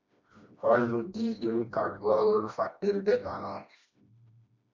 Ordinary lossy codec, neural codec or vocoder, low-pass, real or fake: MP3, 64 kbps; codec, 16 kHz, 1 kbps, FreqCodec, smaller model; 7.2 kHz; fake